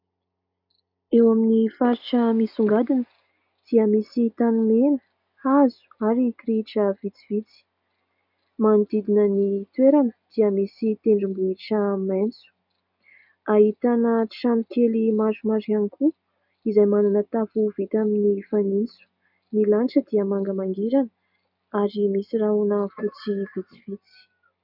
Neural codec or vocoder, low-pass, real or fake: none; 5.4 kHz; real